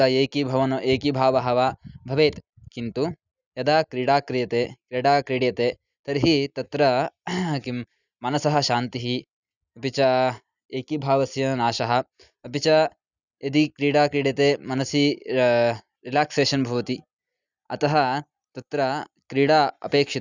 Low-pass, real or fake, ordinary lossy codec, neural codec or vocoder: 7.2 kHz; real; none; none